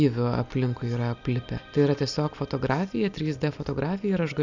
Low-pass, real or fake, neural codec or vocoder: 7.2 kHz; real; none